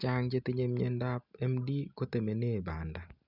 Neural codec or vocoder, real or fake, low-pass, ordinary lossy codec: none; real; 5.4 kHz; none